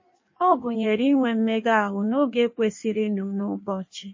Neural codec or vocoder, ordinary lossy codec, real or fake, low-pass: codec, 16 kHz in and 24 kHz out, 1.1 kbps, FireRedTTS-2 codec; MP3, 32 kbps; fake; 7.2 kHz